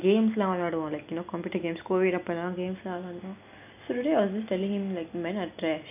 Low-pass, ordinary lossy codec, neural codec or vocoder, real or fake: 3.6 kHz; none; none; real